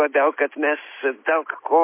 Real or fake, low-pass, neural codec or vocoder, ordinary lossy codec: real; 3.6 kHz; none; MP3, 24 kbps